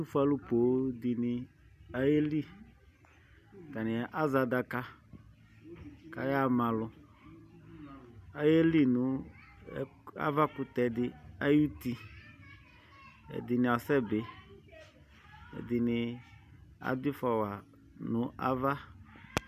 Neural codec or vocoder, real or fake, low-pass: none; real; 14.4 kHz